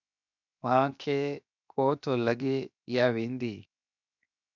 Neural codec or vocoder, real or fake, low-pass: codec, 16 kHz, 0.7 kbps, FocalCodec; fake; 7.2 kHz